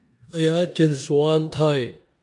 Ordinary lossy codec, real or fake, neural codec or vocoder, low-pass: MP3, 64 kbps; fake; codec, 16 kHz in and 24 kHz out, 0.9 kbps, LongCat-Audio-Codec, four codebook decoder; 10.8 kHz